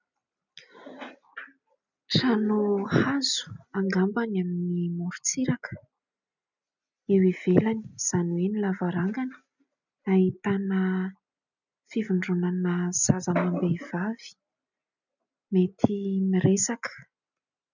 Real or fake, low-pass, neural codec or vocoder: real; 7.2 kHz; none